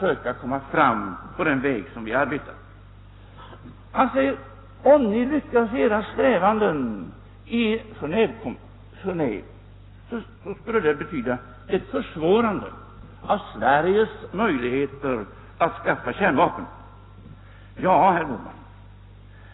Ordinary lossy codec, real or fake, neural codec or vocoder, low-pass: AAC, 16 kbps; real; none; 7.2 kHz